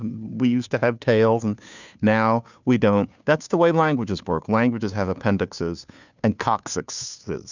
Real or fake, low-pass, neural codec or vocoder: fake; 7.2 kHz; codec, 16 kHz, 2 kbps, FunCodec, trained on Chinese and English, 25 frames a second